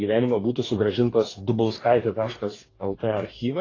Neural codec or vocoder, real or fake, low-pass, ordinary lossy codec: codec, 44.1 kHz, 2.6 kbps, DAC; fake; 7.2 kHz; AAC, 32 kbps